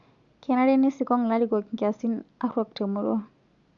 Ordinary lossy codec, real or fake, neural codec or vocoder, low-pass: Opus, 64 kbps; real; none; 7.2 kHz